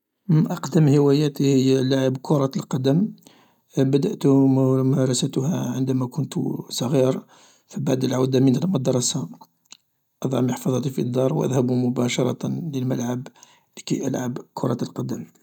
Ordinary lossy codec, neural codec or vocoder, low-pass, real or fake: none; none; 19.8 kHz; real